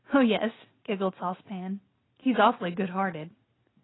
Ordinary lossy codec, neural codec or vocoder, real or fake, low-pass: AAC, 16 kbps; none; real; 7.2 kHz